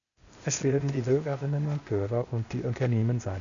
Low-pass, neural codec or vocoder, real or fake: 7.2 kHz; codec, 16 kHz, 0.8 kbps, ZipCodec; fake